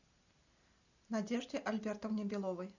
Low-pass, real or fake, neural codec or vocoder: 7.2 kHz; real; none